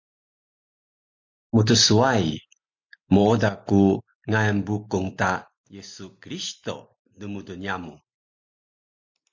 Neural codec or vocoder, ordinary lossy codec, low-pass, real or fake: none; MP3, 48 kbps; 7.2 kHz; real